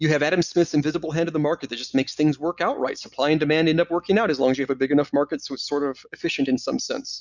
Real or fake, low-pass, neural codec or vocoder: real; 7.2 kHz; none